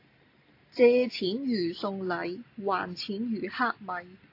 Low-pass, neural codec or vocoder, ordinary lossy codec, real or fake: 5.4 kHz; none; AAC, 32 kbps; real